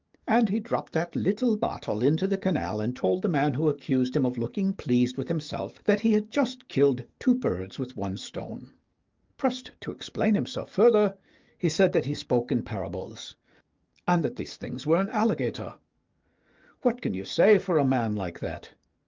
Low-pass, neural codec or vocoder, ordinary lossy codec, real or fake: 7.2 kHz; codec, 44.1 kHz, 7.8 kbps, DAC; Opus, 24 kbps; fake